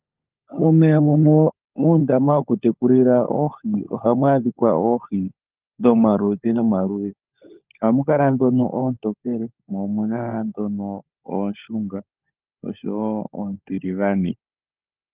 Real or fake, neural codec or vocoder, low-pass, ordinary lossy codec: fake; codec, 16 kHz, 16 kbps, FunCodec, trained on LibriTTS, 50 frames a second; 3.6 kHz; Opus, 32 kbps